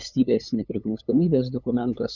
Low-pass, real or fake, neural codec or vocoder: 7.2 kHz; fake; codec, 16 kHz, 8 kbps, FunCodec, trained on LibriTTS, 25 frames a second